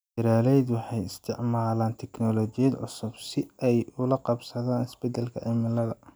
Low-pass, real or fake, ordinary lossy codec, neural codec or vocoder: none; real; none; none